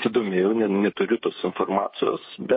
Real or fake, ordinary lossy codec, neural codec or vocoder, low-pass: fake; MP3, 24 kbps; codec, 16 kHz, 8 kbps, FreqCodec, smaller model; 7.2 kHz